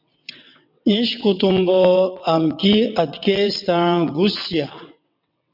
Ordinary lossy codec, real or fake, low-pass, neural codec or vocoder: MP3, 48 kbps; fake; 5.4 kHz; vocoder, 22.05 kHz, 80 mel bands, WaveNeXt